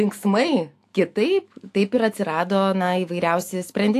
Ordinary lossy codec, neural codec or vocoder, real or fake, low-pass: AAC, 64 kbps; autoencoder, 48 kHz, 128 numbers a frame, DAC-VAE, trained on Japanese speech; fake; 14.4 kHz